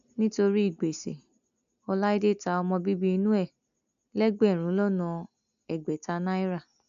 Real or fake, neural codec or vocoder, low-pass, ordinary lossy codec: real; none; 7.2 kHz; none